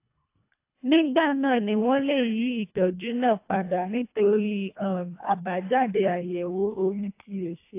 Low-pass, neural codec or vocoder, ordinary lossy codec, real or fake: 3.6 kHz; codec, 24 kHz, 1.5 kbps, HILCodec; AAC, 24 kbps; fake